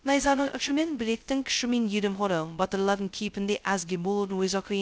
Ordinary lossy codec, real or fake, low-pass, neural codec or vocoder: none; fake; none; codec, 16 kHz, 0.2 kbps, FocalCodec